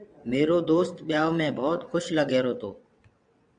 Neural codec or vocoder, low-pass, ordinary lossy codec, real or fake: vocoder, 22.05 kHz, 80 mel bands, WaveNeXt; 9.9 kHz; MP3, 96 kbps; fake